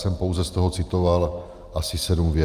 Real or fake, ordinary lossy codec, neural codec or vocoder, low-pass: real; Opus, 32 kbps; none; 14.4 kHz